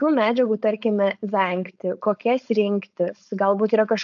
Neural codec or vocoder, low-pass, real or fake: codec, 16 kHz, 4.8 kbps, FACodec; 7.2 kHz; fake